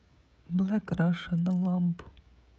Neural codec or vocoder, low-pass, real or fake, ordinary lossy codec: codec, 16 kHz, 16 kbps, FreqCodec, smaller model; none; fake; none